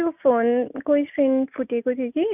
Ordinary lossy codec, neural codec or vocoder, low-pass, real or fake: none; none; 3.6 kHz; real